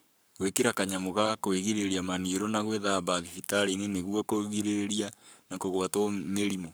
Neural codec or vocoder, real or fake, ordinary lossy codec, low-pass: codec, 44.1 kHz, 7.8 kbps, Pupu-Codec; fake; none; none